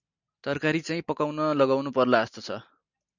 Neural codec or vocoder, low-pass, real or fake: none; 7.2 kHz; real